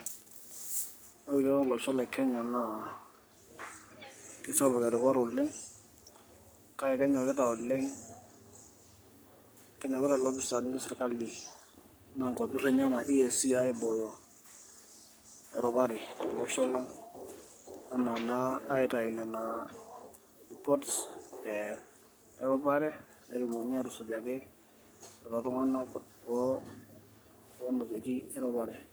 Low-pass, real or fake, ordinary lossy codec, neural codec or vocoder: none; fake; none; codec, 44.1 kHz, 3.4 kbps, Pupu-Codec